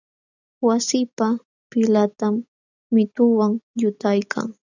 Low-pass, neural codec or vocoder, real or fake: 7.2 kHz; none; real